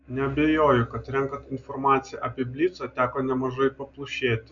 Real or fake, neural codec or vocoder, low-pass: real; none; 7.2 kHz